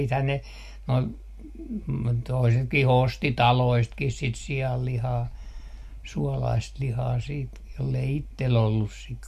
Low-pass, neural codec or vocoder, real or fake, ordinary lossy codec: 14.4 kHz; none; real; MP3, 64 kbps